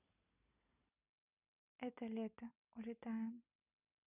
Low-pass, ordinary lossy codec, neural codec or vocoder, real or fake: 3.6 kHz; none; none; real